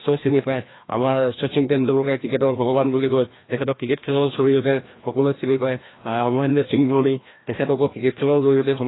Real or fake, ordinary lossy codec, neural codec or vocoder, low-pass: fake; AAC, 16 kbps; codec, 16 kHz, 1 kbps, FreqCodec, larger model; 7.2 kHz